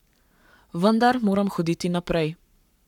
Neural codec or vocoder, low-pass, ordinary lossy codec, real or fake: codec, 44.1 kHz, 7.8 kbps, Pupu-Codec; 19.8 kHz; none; fake